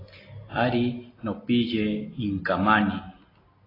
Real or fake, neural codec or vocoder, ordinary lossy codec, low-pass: real; none; AAC, 24 kbps; 5.4 kHz